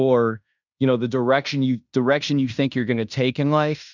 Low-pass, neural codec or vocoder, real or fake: 7.2 kHz; codec, 16 kHz in and 24 kHz out, 0.9 kbps, LongCat-Audio-Codec, fine tuned four codebook decoder; fake